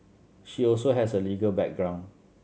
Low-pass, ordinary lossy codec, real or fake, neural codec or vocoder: none; none; real; none